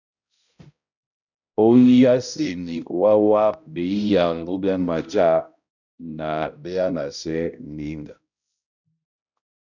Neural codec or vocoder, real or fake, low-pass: codec, 16 kHz, 0.5 kbps, X-Codec, HuBERT features, trained on balanced general audio; fake; 7.2 kHz